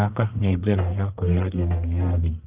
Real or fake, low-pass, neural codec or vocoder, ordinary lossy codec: fake; 3.6 kHz; codec, 44.1 kHz, 1.7 kbps, Pupu-Codec; Opus, 32 kbps